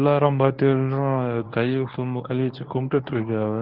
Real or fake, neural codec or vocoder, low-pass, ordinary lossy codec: fake; codec, 24 kHz, 0.9 kbps, WavTokenizer, medium speech release version 1; 5.4 kHz; Opus, 16 kbps